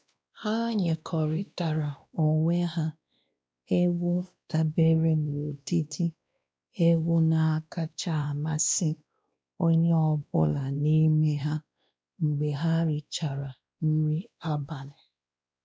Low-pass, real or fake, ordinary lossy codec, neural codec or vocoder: none; fake; none; codec, 16 kHz, 1 kbps, X-Codec, WavLM features, trained on Multilingual LibriSpeech